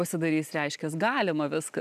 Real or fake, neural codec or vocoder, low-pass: real; none; 14.4 kHz